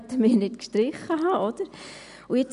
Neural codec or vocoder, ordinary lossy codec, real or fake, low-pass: none; none; real; 10.8 kHz